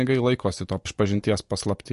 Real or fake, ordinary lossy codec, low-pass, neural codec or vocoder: fake; MP3, 48 kbps; 14.4 kHz; vocoder, 44.1 kHz, 128 mel bands every 256 samples, BigVGAN v2